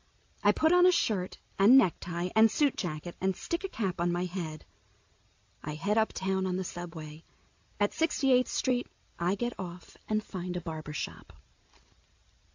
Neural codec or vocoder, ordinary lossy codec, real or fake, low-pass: none; AAC, 48 kbps; real; 7.2 kHz